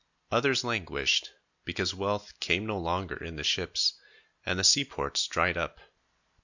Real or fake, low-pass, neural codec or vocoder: real; 7.2 kHz; none